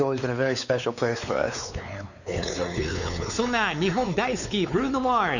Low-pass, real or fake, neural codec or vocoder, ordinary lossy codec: 7.2 kHz; fake; codec, 16 kHz, 4 kbps, X-Codec, WavLM features, trained on Multilingual LibriSpeech; none